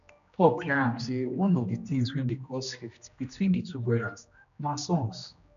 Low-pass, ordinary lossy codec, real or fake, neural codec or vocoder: 7.2 kHz; none; fake; codec, 16 kHz, 1 kbps, X-Codec, HuBERT features, trained on general audio